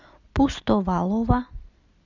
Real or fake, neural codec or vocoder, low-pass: real; none; 7.2 kHz